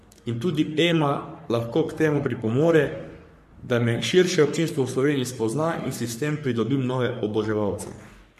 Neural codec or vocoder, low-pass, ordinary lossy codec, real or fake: codec, 44.1 kHz, 3.4 kbps, Pupu-Codec; 14.4 kHz; MP3, 64 kbps; fake